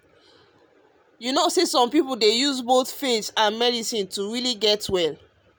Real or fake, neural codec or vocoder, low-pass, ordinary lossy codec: real; none; none; none